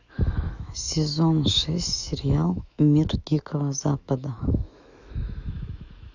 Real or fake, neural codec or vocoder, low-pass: fake; autoencoder, 48 kHz, 128 numbers a frame, DAC-VAE, trained on Japanese speech; 7.2 kHz